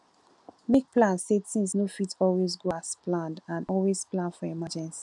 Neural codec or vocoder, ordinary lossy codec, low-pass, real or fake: none; none; 10.8 kHz; real